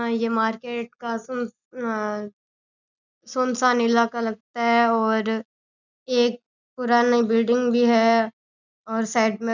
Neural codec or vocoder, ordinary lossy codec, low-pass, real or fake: none; none; 7.2 kHz; real